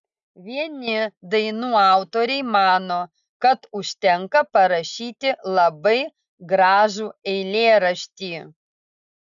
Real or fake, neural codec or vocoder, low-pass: real; none; 7.2 kHz